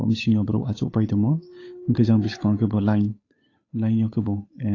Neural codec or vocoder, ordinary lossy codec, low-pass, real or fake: codec, 16 kHz, 8 kbps, FunCodec, trained on Chinese and English, 25 frames a second; AAC, 32 kbps; 7.2 kHz; fake